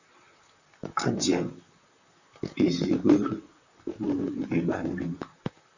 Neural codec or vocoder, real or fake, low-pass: vocoder, 44.1 kHz, 128 mel bands, Pupu-Vocoder; fake; 7.2 kHz